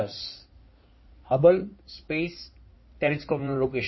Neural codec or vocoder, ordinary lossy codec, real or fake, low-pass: codec, 16 kHz in and 24 kHz out, 2.2 kbps, FireRedTTS-2 codec; MP3, 24 kbps; fake; 7.2 kHz